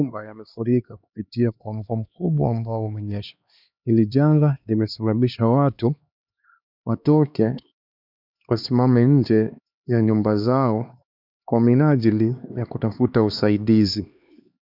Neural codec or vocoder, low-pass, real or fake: codec, 16 kHz, 2 kbps, X-Codec, HuBERT features, trained on LibriSpeech; 5.4 kHz; fake